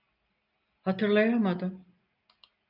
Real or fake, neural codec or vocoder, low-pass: real; none; 5.4 kHz